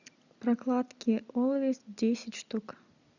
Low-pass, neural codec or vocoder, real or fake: 7.2 kHz; none; real